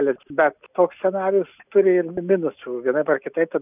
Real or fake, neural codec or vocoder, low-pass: real; none; 3.6 kHz